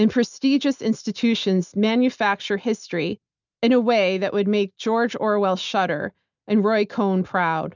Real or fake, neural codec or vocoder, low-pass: real; none; 7.2 kHz